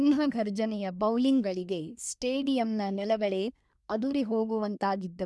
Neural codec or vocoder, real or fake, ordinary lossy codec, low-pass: codec, 24 kHz, 1 kbps, SNAC; fake; none; none